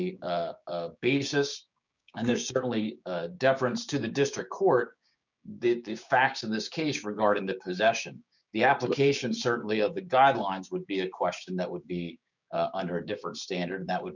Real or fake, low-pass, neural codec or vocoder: fake; 7.2 kHz; codec, 16 kHz, 6 kbps, DAC